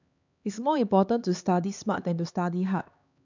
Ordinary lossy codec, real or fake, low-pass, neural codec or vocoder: none; fake; 7.2 kHz; codec, 16 kHz, 2 kbps, X-Codec, HuBERT features, trained on LibriSpeech